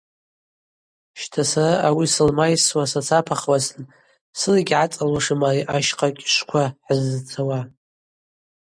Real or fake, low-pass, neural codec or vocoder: real; 9.9 kHz; none